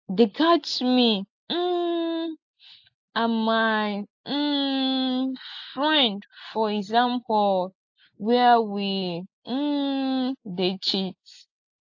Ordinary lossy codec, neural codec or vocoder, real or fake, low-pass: AAC, 48 kbps; none; real; 7.2 kHz